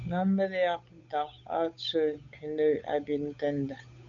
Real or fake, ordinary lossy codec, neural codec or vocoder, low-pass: fake; AAC, 64 kbps; codec, 16 kHz, 8 kbps, FunCodec, trained on Chinese and English, 25 frames a second; 7.2 kHz